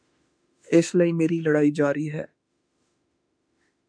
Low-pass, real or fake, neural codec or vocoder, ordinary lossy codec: 9.9 kHz; fake; autoencoder, 48 kHz, 32 numbers a frame, DAC-VAE, trained on Japanese speech; MP3, 96 kbps